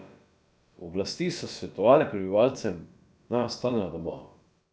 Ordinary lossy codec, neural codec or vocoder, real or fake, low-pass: none; codec, 16 kHz, about 1 kbps, DyCAST, with the encoder's durations; fake; none